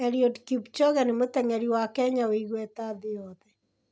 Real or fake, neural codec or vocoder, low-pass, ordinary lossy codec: real; none; none; none